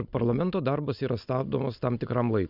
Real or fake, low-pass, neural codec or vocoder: real; 5.4 kHz; none